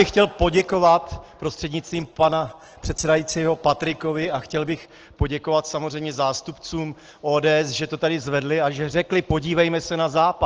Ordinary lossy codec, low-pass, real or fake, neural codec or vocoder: Opus, 16 kbps; 7.2 kHz; real; none